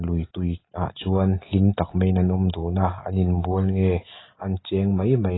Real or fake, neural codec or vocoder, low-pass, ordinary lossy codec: real; none; 7.2 kHz; AAC, 16 kbps